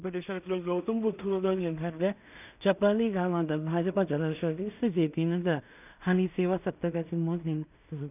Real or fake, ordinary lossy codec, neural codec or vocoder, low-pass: fake; none; codec, 16 kHz in and 24 kHz out, 0.4 kbps, LongCat-Audio-Codec, two codebook decoder; 3.6 kHz